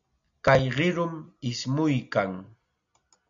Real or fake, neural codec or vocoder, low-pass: real; none; 7.2 kHz